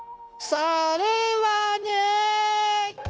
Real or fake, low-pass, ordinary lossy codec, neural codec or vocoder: fake; none; none; codec, 16 kHz, 0.9 kbps, LongCat-Audio-Codec